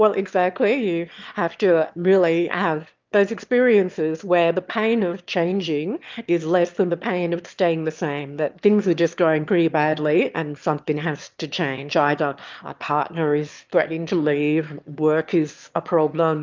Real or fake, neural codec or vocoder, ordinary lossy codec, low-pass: fake; autoencoder, 22.05 kHz, a latent of 192 numbers a frame, VITS, trained on one speaker; Opus, 24 kbps; 7.2 kHz